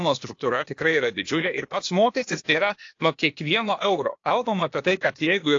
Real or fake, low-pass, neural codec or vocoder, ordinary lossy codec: fake; 7.2 kHz; codec, 16 kHz, 0.8 kbps, ZipCodec; AAC, 48 kbps